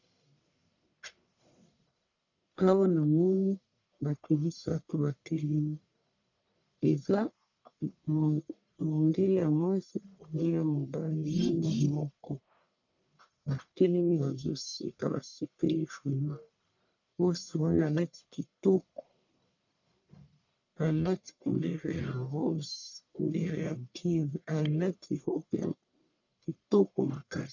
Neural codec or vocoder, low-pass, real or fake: codec, 44.1 kHz, 1.7 kbps, Pupu-Codec; 7.2 kHz; fake